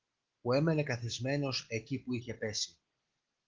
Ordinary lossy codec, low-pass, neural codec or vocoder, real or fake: Opus, 16 kbps; 7.2 kHz; none; real